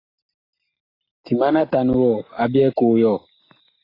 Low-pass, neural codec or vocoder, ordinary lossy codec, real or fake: 5.4 kHz; none; AAC, 24 kbps; real